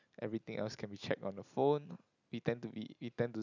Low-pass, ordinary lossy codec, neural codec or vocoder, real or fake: 7.2 kHz; none; none; real